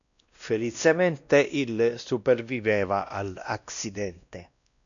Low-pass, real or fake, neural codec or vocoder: 7.2 kHz; fake; codec, 16 kHz, 1 kbps, X-Codec, WavLM features, trained on Multilingual LibriSpeech